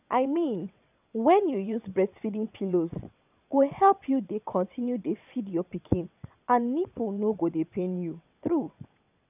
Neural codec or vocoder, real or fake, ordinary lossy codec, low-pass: vocoder, 22.05 kHz, 80 mel bands, WaveNeXt; fake; none; 3.6 kHz